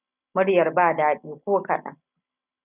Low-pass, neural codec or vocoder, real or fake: 3.6 kHz; none; real